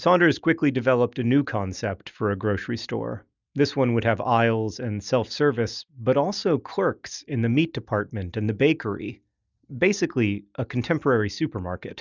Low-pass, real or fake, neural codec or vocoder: 7.2 kHz; real; none